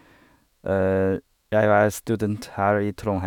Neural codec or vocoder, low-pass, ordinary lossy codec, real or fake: autoencoder, 48 kHz, 128 numbers a frame, DAC-VAE, trained on Japanese speech; 19.8 kHz; none; fake